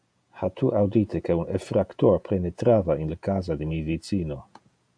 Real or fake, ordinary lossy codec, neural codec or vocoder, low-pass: fake; AAC, 64 kbps; vocoder, 48 kHz, 128 mel bands, Vocos; 9.9 kHz